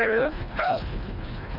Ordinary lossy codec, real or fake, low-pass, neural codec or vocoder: none; fake; 5.4 kHz; codec, 24 kHz, 1.5 kbps, HILCodec